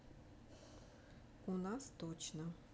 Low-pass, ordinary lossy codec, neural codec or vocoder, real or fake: none; none; none; real